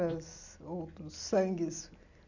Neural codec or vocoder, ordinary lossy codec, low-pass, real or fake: none; none; 7.2 kHz; real